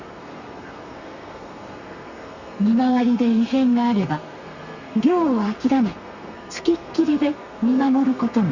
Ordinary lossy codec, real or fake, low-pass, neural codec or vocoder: Opus, 64 kbps; fake; 7.2 kHz; codec, 32 kHz, 1.9 kbps, SNAC